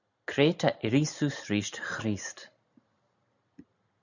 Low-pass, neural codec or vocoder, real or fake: 7.2 kHz; none; real